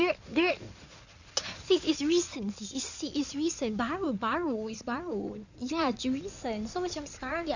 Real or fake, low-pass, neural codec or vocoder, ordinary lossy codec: fake; 7.2 kHz; codec, 16 kHz in and 24 kHz out, 2.2 kbps, FireRedTTS-2 codec; none